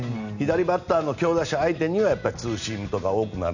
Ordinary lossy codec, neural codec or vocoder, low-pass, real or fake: none; none; 7.2 kHz; real